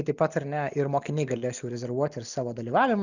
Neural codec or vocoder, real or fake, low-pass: none; real; 7.2 kHz